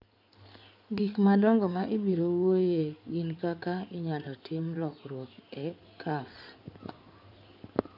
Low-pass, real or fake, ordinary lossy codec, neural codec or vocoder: 5.4 kHz; fake; none; codec, 16 kHz in and 24 kHz out, 2.2 kbps, FireRedTTS-2 codec